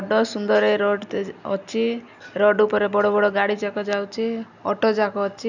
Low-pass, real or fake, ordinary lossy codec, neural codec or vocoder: 7.2 kHz; real; none; none